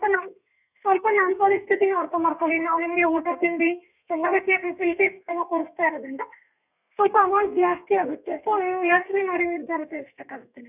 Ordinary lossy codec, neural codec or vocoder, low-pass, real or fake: none; codec, 32 kHz, 1.9 kbps, SNAC; 3.6 kHz; fake